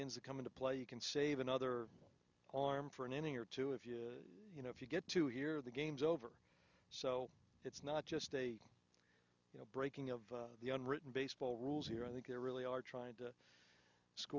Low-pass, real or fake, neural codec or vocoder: 7.2 kHz; real; none